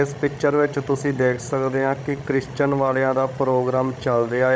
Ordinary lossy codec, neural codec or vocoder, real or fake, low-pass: none; codec, 16 kHz, 16 kbps, FreqCodec, larger model; fake; none